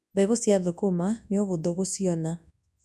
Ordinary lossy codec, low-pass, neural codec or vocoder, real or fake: none; none; codec, 24 kHz, 0.9 kbps, WavTokenizer, large speech release; fake